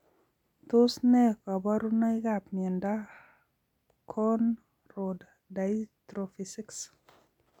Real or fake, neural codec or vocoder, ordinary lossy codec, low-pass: real; none; none; 19.8 kHz